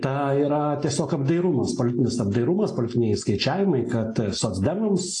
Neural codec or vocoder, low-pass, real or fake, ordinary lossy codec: none; 10.8 kHz; real; AAC, 48 kbps